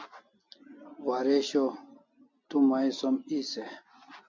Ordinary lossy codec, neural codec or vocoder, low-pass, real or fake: MP3, 48 kbps; none; 7.2 kHz; real